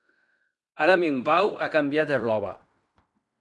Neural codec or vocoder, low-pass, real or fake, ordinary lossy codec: codec, 16 kHz in and 24 kHz out, 0.9 kbps, LongCat-Audio-Codec, fine tuned four codebook decoder; 10.8 kHz; fake; MP3, 96 kbps